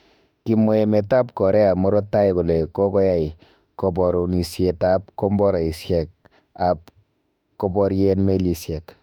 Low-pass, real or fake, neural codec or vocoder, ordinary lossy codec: 19.8 kHz; fake; autoencoder, 48 kHz, 32 numbers a frame, DAC-VAE, trained on Japanese speech; none